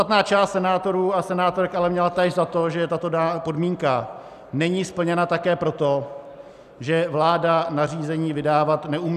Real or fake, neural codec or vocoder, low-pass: real; none; 14.4 kHz